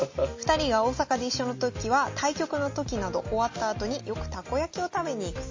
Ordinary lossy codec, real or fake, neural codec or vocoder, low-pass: MP3, 32 kbps; real; none; 7.2 kHz